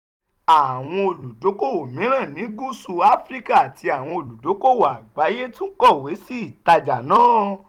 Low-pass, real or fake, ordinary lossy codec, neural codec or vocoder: 19.8 kHz; fake; none; vocoder, 44.1 kHz, 128 mel bands every 256 samples, BigVGAN v2